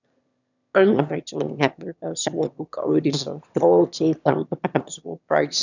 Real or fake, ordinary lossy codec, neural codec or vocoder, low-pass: fake; none; autoencoder, 22.05 kHz, a latent of 192 numbers a frame, VITS, trained on one speaker; 7.2 kHz